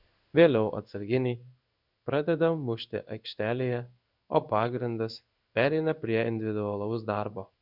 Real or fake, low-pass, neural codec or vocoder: fake; 5.4 kHz; codec, 16 kHz in and 24 kHz out, 1 kbps, XY-Tokenizer